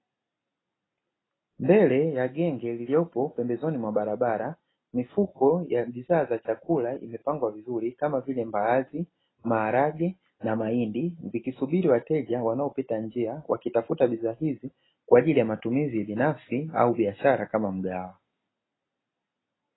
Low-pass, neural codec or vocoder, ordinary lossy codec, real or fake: 7.2 kHz; none; AAC, 16 kbps; real